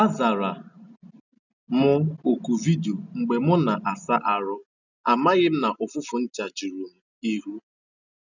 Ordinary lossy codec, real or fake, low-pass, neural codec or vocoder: none; real; 7.2 kHz; none